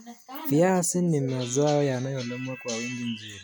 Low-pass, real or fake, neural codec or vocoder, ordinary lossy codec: none; real; none; none